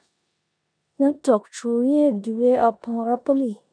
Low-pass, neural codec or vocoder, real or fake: 9.9 kHz; codec, 16 kHz in and 24 kHz out, 0.9 kbps, LongCat-Audio-Codec, four codebook decoder; fake